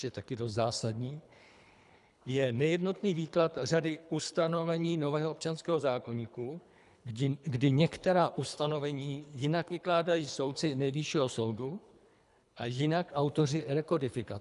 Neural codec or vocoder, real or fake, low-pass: codec, 24 kHz, 3 kbps, HILCodec; fake; 10.8 kHz